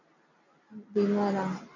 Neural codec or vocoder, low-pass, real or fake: none; 7.2 kHz; real